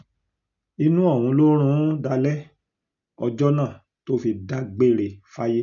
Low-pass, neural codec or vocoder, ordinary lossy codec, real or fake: 7.2 kHz; none; none; real